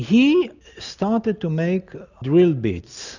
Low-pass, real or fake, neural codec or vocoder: 7.2 kHz; real; none